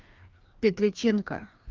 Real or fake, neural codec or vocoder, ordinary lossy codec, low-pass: fake; codec, 16 kHz, 2 kbps, FreqCodec, larger model; Opus, 32 kbps; 7.2 kHz